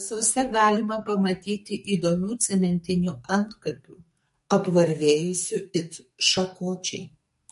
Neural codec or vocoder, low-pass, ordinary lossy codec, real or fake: codec, 44.1 kHz, 2.6 kbps, SNAC; 14.4 kHz; MP3, 48 kbps; fake